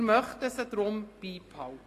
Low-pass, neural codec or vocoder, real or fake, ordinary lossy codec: 14.4 kHz; none; real; AAC, 48 kbps